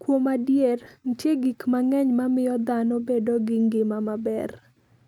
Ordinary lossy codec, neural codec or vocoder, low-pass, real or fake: none; none; 19.8 kHz; real